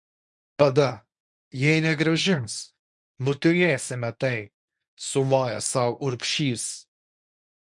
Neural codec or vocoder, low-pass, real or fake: codec, 24 kHz, 0.9 kbps, WavTokenizer, medium speech release version 1; 10.8 kHz; fake